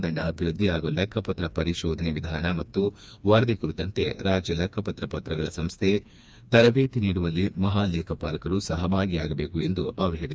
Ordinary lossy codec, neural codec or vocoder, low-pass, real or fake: none; codec, 16 kHz, 2 kbps, FreqCodec, smaller model; none; fake